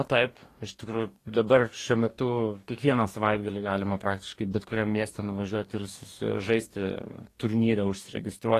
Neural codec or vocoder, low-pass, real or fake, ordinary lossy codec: codec, 44.1 kHz, 2.6 kbps, DAC; 14.4 kHz; fake; AAC, 48 kbps